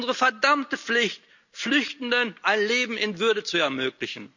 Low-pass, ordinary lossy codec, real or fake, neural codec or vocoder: 7.2 kHz; none; real; none